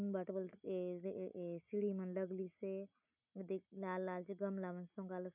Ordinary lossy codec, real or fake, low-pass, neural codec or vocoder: none; real; 3.6 kHz; none